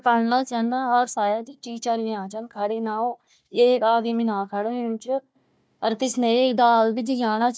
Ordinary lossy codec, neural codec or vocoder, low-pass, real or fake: none; codec, 16 kHz, 1 kbps, FunCodec, trained on Chinese and English, 50 frames a second; none; fake